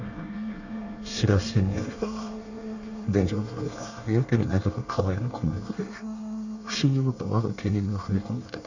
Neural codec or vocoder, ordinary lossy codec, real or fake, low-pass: codec, 24 kHz, 1 kbps, SNAC; none; fake; 7.2 kHz